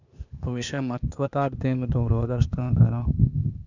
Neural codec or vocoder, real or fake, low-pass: codec, 16 kHz, 0.8 kbps, ZipCodec; fake; 7.2 kHz